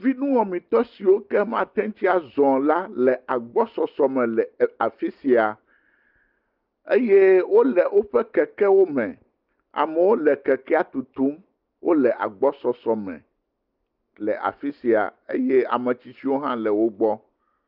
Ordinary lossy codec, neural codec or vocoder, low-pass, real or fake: Opus, 32 kbps; none; 5.4 kHz; real